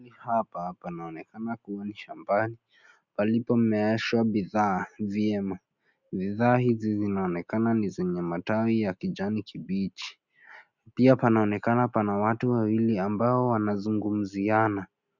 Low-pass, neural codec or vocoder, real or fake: 7.2 kHz; none; real